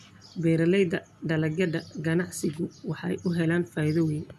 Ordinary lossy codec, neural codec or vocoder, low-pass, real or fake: none; none; 14.4 kHz; real